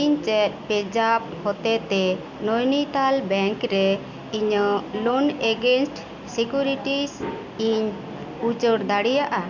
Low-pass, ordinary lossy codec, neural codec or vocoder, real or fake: 7.2 kHz; Opus, 64 kbps; none; real